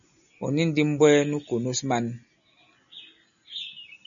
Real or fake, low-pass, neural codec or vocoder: real; 7.2 kHz; none